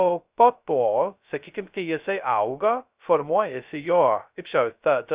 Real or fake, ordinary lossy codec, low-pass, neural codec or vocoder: fake; Opus, 64 kbps; 3.6 kHz; codec, 16 kHz, 0.2 kbps, FocalCodec